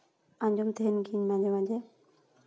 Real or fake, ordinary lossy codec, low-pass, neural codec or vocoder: real; none; none; none